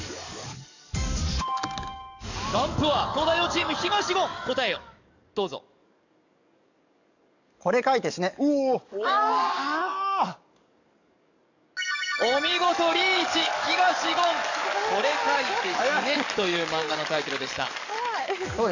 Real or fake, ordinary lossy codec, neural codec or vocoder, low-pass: fake; none; codec, 44.1 kHz, 7.8 kbps, DAC; 7.2 kHz